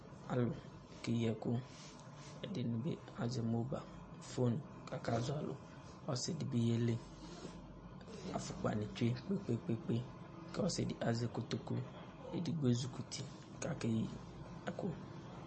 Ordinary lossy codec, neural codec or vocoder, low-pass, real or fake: MP3, 32 kbps; none; 10.8 kHz; real